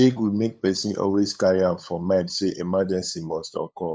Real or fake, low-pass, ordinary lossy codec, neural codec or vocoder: fake; none; none; codec, 16 kHz, 8 kbps, FunCodec, trained on LibriTTS, 25 frames a second